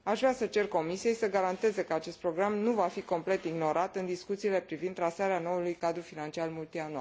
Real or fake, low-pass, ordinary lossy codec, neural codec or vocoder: real; none; none; none